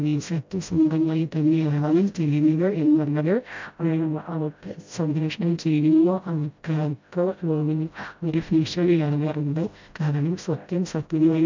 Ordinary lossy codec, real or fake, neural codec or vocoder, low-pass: MP3, 64 kbps; fake; codec, 16 kHz, 0.5 kbps, FreqCodec, smaller model; 7.2 kHz